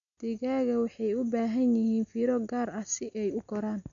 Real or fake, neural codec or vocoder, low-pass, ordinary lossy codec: real; none; 7.2 kHz; none